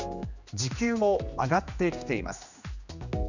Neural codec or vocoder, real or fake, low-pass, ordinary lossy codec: codec, 16 kHz, 2 kbps, X-Codec, HuBERT features, trained on general audio; fake; 7.2 kHz; none